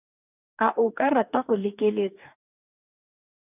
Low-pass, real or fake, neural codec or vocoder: 3.6 kHz; fake; codec, 44.1 kHz, 2.6 kbps, DAC